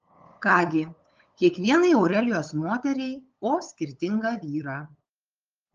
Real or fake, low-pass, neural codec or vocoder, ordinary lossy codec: fake; 7.2 kHz; codec, 16 kHz, 8 kbps, FunCodec, trained on LibriTTS, 25 frames a second; Opus, 24 kbps